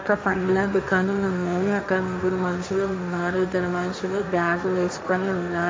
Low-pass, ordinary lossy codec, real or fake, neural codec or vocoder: none; none; fake; codec, 16 kHz, 1.1 kbps, Voila-Tokenizer